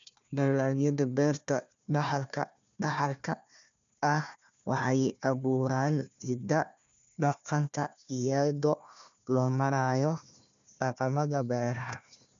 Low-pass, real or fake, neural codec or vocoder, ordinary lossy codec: 7.2 kHz; fake; codec, 16 kHz, 1 kbps, FunCodec, trained on Chinese and English, 50 frames a second; none